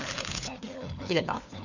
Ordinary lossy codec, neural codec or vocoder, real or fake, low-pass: none; codec, 16 kHz, 4 kbps, FunCodec, trained on LibriTTS, 50 frames a second; fake; 7.2 kHz